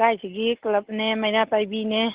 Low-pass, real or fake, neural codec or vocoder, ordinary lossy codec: 3.6 kHz; real; none; Opus, 16 kbps